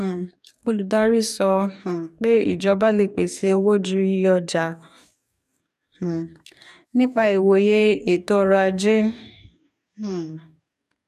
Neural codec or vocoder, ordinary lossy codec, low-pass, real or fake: codec, 44.1 kHz, 2.6 kbps, DAC; none; 14.4 kHz; fake